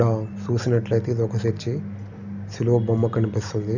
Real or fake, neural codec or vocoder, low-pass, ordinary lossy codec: real; none; 7.2 kHz; none